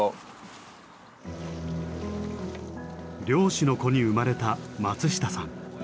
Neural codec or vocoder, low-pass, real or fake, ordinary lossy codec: none; none; real; none